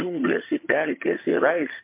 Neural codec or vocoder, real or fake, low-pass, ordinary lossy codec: vocoder, 22.05 kHz, 80 mel bands, HiFi-GAN; fake; 3.6 kHz; MP3, 24 kbps